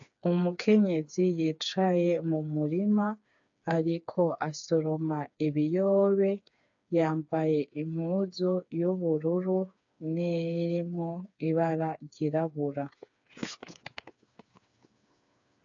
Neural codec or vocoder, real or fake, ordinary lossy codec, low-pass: codec, 16 kHz, 4 kbps, FreqCodec, smaller model; fake; AAC, 64 kbps; 7.2 kHz